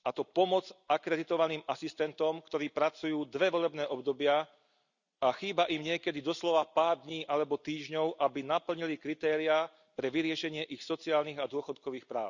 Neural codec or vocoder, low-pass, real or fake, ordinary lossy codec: none; 7.2 kHz; real; none